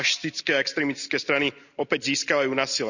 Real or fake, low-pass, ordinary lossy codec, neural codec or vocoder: real; 7.2 kHz; none; none